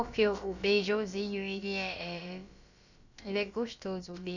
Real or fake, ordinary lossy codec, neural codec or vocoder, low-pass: fake; none; codec, 16 kHz, about 1 kbps, DyCAST, with the encoder's durations; 7.2 kHz